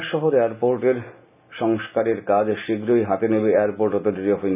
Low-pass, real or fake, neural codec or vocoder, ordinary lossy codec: 3.6 kHz; real; none; none